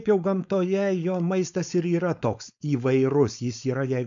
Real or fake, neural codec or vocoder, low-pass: fake; codec, 16 kHz, 4.8 kbps, FACodec; 7.2 kHz